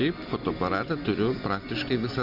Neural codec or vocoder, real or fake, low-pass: none; real; 5.4 kHz